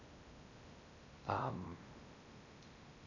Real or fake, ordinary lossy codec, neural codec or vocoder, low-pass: fake; none; codec, 16 kHz in and 24 kHz out, 0.8 kbps, FocalCodec, streaming, 65536 codes; 7.2 kHz